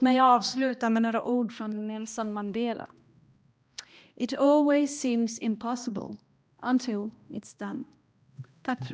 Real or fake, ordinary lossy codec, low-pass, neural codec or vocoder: fake; none; none; codec, 16 kHz, 1 kbps, X-Codec, HuBERT features, trained on balanced general audio